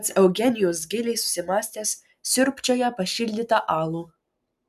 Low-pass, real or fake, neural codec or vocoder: 14.4 kHz; real; none